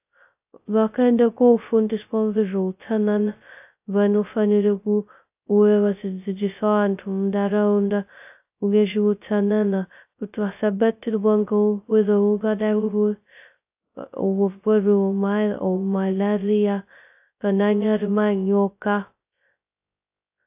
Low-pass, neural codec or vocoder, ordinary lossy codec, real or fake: 3.6 kHz; codec, 16 kHz, 0.2 kbps, FocalCodec; AAC, 32 kbps; fake